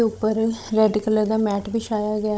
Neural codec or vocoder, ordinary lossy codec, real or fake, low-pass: codec, 16 kHz, 16 kbps, FunCodec, trained on Chinese and English, 50 frames a second; none; fake; none